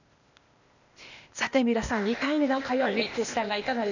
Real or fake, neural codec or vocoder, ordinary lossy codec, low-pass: fake; codec, 16 kHz, 0.8 kbps, ZipCodec; none; 7.2 kHz